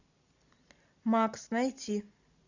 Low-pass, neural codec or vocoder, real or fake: 7.2 kHz; none; real